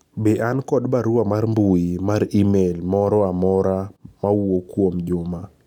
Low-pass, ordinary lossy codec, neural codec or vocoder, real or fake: 19.8 kHz; none; none; real